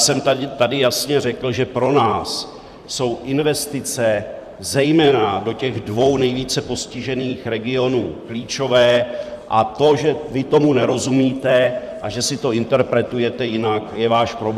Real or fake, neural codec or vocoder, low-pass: fake; vocoder, 44.1 kHz, 128 mel bands, Pupu-Vocoder; 14.4 kHz